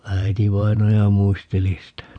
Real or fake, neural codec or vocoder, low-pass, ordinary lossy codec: fake; vocoder, 44.1 kHz, 128 mel bands every 512 samples, BigVGAN v2; 9.9 kHz; Opus, 64 kbps